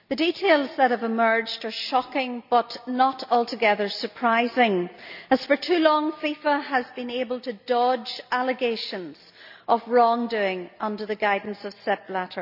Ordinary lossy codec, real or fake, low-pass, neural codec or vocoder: none; real; 5.4 kHz; none